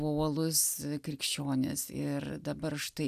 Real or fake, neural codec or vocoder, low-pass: real; none; 14.4 kHz